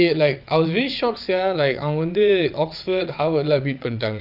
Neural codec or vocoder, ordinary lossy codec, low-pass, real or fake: vocoder, 22.05 kHz, 80 mel bands, WaveNeXt; none; 5.4 kHz; fake